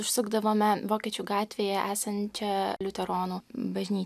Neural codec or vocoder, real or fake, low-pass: none; real; 14.4 kHz